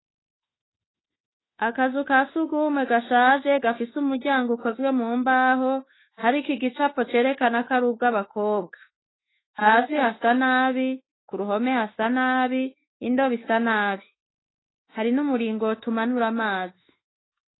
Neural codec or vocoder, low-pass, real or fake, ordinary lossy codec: autoencoder, 48 kHz, 32 numbers a frame, DAC-VAE, trained on Japanese speech; 7.2 kHz; fake; AAC, 16 kbps